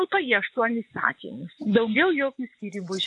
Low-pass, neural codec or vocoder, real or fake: 10.8 kHz; vocoder, 24 kHz, 100 mel bands, Vocos; fake